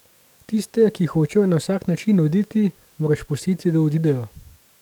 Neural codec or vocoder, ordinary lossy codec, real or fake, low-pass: codec, 44.1 kHz, 7.8 kbps, DAC; none; fake; 19.8 kHz